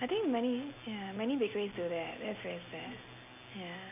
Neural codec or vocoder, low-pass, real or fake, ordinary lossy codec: none; 3.6 kHz; real; none